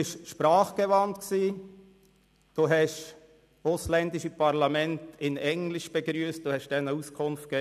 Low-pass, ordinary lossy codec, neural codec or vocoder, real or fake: 14.4 kHz; none; none; real